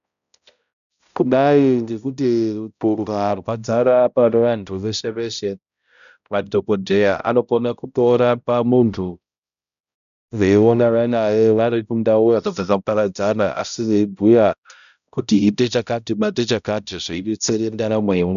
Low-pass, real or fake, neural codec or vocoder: 7.2 kHz; fake; codec, 16 kHz, 0.5 kbps, X-Codec, HuBERT features, trained on balanced general audio